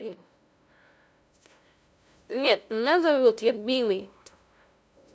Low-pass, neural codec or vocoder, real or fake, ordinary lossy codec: none; codec, 16 kHz, 0.5 kbps, FunCodec, trained on LibriTTS, 25 frames a second; fake; none